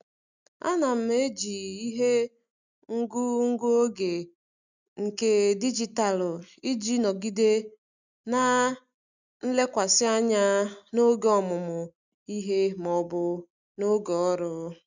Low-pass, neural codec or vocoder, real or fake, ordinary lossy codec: 7.2 kHz; none; real; none